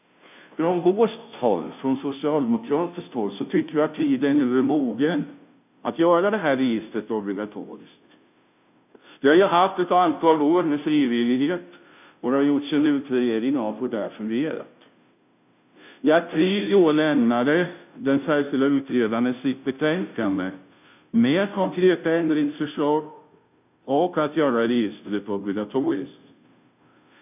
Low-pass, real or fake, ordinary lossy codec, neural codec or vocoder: 3.6 kHz; fake; none; codec, 16 kHz, 0.5 kbps, FunCodec, trained on Chinese and English, 25 frames a second